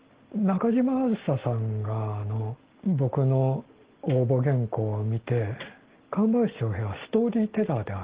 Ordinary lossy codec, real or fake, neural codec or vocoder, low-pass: Opus, 16 kbps; real; none; 3.6 kHz